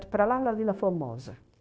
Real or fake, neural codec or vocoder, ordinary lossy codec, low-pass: fake; codec, 16 kHz, 0.9 kbps, LongCat-Audio-Codec; none; none